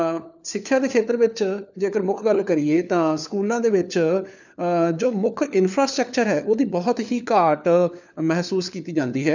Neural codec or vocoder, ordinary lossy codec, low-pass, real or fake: codec, 16 kHz, 4 kbps, FunCodec, trained on LibriTTS, 50 frames a second; none; 7.2 kHz; fake